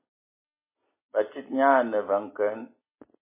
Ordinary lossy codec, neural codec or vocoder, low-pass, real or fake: MP3, 16 kbps; none; 3.6 kHz; real